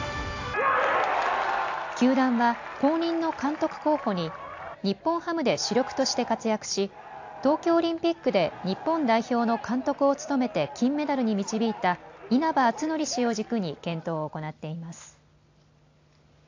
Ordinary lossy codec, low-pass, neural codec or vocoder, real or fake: AAC, 48 kbps; 7.2 kHz; none; real